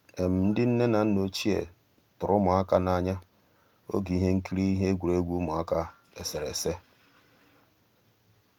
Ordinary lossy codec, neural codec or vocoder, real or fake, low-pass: Opus, 32 kbps; none; real; 19.8 kHz